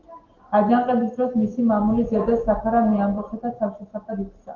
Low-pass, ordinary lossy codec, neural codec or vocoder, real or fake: 7.2 kHz; Opus, 16 kbps; none; real